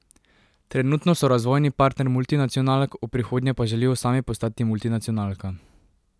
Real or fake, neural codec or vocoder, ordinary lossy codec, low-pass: real; none; none; none